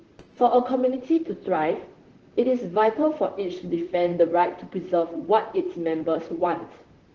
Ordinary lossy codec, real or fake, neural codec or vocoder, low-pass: Opus, 16 kbps; fake; vocoder, 44.1 kHz, 128 mel bands, Pupu-Vocoder; 7.2 kHz